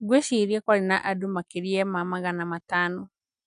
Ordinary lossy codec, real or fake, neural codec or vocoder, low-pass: none; real; none; 9.9 kHz